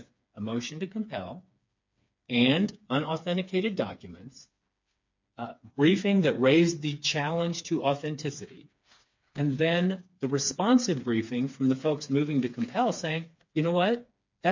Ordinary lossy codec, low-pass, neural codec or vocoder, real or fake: MP3, 48 kbps; 7.2 kHz; codec, 16 kHz, 4 kbps, FreqCodec, smaller model; fake